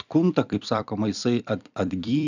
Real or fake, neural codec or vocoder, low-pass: fake; vocoder, 44.1 kHz, 128 mel bands every 256 samples, BigVGAN v2; 7.2 kHz